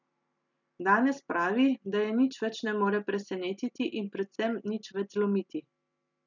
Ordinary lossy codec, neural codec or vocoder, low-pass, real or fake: none; none; 7.2 kHz; real